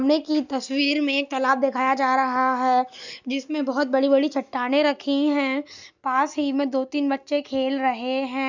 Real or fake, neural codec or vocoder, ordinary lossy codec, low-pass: real; none; none; 7.2 kHz